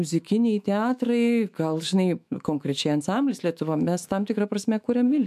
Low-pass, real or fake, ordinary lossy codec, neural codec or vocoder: 14.4 kHz; fake; MP3, 96 kbps; autoencoder, 48 kHz, 128 numbers a frame, DAC-VAE, trained on Japanese speech